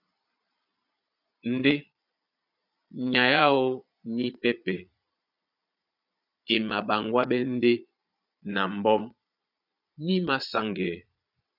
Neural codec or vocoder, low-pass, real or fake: vocoder, 22.05 kHz, 80 mel bands, Vocos; 5.4 kHz; fake